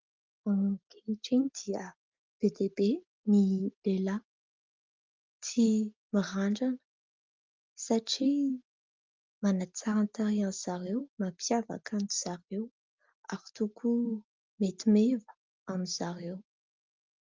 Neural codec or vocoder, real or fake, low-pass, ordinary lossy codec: none; real; 7.2 kHz; Opus, 32 kbps